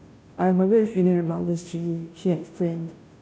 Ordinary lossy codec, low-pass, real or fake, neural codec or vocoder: none; none; fake; codec, 16 kHz, 0.5 kbps, FunCodec, trained on Chinese and English, 25 frames a second